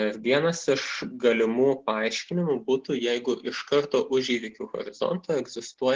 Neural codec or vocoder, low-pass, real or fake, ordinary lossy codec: none; 7.2 kHz; real; Opus, 16 kbps